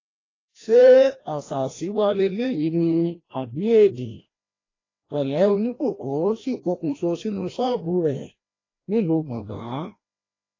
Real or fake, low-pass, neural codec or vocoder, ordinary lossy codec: fake; 7.2 kHz; codec, 16 kHz, 1 kbps, FreqCodec, larger model; AAC, 32 kbps